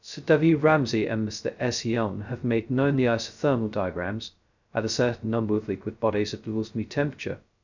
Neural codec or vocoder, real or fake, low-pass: codec, 16 kHz, 0.2 kbps, FocalCodec; fake; 7.2 kHz